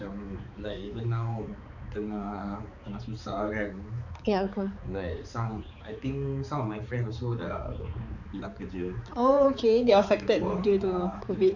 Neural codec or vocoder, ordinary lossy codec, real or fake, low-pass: codec, 16 kHz, 4 kbps, X-Codec, HuBERT features, trained on balanced general audio; none; fake; 7.2 kHz